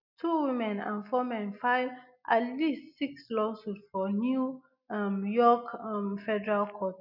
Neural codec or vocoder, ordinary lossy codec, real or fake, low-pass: none; none; real; 5.4 kHz